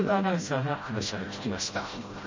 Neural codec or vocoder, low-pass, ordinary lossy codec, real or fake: codec, 16 kHz, 0.5 kbps, FreqCodec, smaller model; 7.2 kHz; MP3, 32 kbps; fake